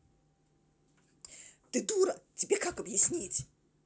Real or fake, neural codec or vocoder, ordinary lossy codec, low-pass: real; none; none; none